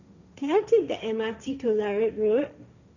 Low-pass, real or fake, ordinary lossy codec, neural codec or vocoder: 7.2 kHz; fake; none; codec, 16 kHz, 1.1 kbps, Voila-Tokenizer